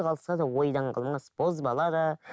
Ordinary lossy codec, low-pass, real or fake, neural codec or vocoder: none; none; real; none